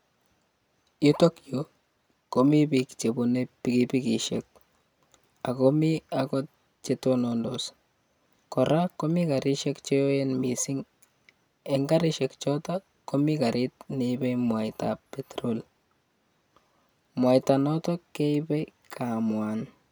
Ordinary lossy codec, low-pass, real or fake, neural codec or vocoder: none; none; real; none